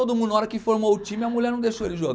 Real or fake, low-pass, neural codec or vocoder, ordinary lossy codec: real; none; none; none